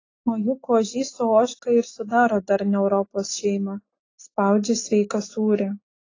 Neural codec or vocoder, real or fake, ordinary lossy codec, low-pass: none; real; AAC, 32 kbps; 7.2 kHz